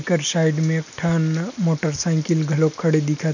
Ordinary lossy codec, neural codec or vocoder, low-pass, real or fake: none; none; 7.2 kHz; real